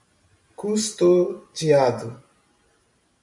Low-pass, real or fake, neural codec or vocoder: 10.8 kHz; real; none